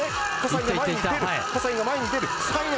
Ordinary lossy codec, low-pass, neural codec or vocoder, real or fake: none; none; none; real